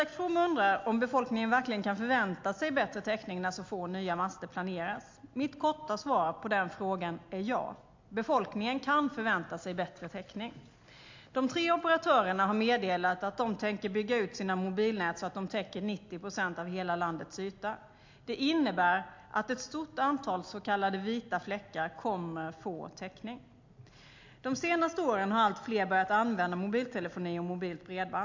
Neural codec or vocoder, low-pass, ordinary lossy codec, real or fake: none; 7.2 kHz; MP3, 48 kbps; real